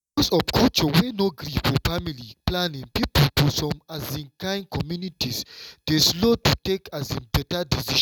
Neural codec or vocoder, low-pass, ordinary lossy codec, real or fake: none; 19.8 kHz; none; real